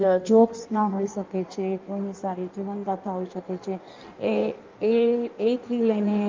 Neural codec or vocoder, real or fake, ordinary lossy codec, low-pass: codec, 16 kHz in and 24 kHz out, 1.1 kbps, FireRedTTS-2 codec; fake; Opus, 24 kbps; 7.2 kHz